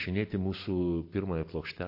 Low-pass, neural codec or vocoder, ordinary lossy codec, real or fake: 5.4 kHz; none; MP3, 32 kbps; real